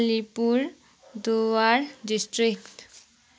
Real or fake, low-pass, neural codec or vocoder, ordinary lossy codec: real; none; none; none